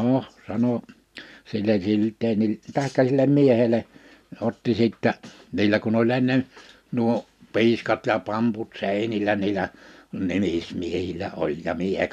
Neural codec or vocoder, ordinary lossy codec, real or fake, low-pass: none; none; real; 14.4 kHz